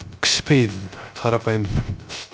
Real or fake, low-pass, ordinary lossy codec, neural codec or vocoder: fake; none; none; codec, 16 kHz, 0.3 kbps, FocalCodec